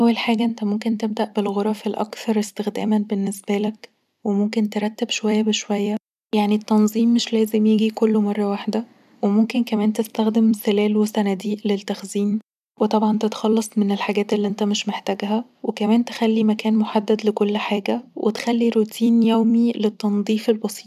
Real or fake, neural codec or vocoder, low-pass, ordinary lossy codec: fake; vocoder, 44.1 kHz, 128 mel bands every 256 samples, BigVGAN v2; 14.4 kHz; none